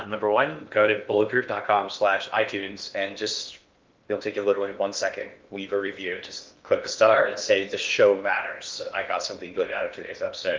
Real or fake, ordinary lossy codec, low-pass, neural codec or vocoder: fake; Opus, 24 kbps; 7.2 kHz; codec, 16 kHz in and 24 kHz out, 0.8 kbps, FocalCodec, streaming, 65536 codes